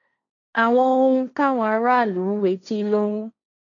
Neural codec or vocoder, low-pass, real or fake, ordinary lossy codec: codec, 16 kHz, 1.1 kbps, Voila-Tokenizer; 7.2 kHz; fake; none